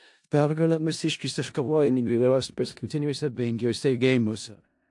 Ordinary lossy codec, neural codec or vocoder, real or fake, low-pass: AAC, 64 kbps; codec, 16 kHz in and 24 kHz out, 0.4 kbps, LongCat-Audio-Codec, four codebook decoder; fake; 10.8 kHz